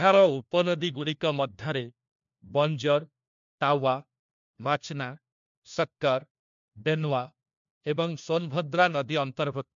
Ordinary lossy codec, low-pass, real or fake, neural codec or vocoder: MP3, 64 kbps; 7.2 kHz; fake; codec, 16 kHz, 1 kbps, FunCodec, trained on LibriTTS, 50 frames a second